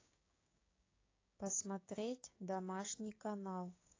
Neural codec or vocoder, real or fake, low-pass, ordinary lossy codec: codec, 16 kHz, 6 kbps, DAC; fake; 7.2 kHz; AAC, 32 kbps